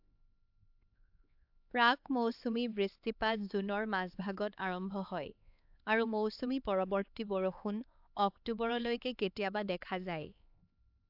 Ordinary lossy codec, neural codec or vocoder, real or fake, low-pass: AAC, 48 kbps; codec, 16 kHz, 4 kbps, X-Codec, HuBERT features, trained on LibriSpeech; fake; 5.4 kHz